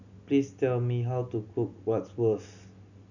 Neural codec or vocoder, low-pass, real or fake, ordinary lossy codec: none; 7.2 kHz; real; none